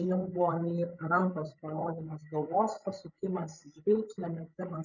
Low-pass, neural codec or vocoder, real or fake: 7.2 kHz; codec, 16 kHz, 16 kbps, FreqCodec, larger model; fake